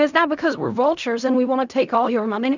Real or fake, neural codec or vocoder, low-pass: fake; codec, 16 kHz in and 24 kHz out, 0.4 kbps, LongCat-Audio-Codec, fine tuned four codebook decoder; 7.2 kHz